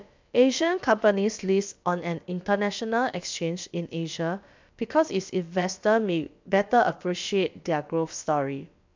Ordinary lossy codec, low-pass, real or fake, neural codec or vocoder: MP3, 64 kbps; 7.2 kHz; fake; codec, 16 kHz, about 1 kbps, DyCAST, with the encoder's durations